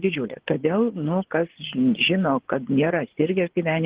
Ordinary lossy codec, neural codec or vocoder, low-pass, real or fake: Opus, 16 kbps; vocoder, 24 kHz, 100 mel bands, Vocos; 3.6 kHz; fake